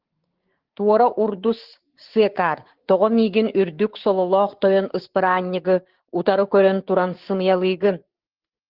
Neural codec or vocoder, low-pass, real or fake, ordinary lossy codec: codec, 16 kHz, 6 kbps, DAC; 5.4 kHz; fake; Opus, 16 kbps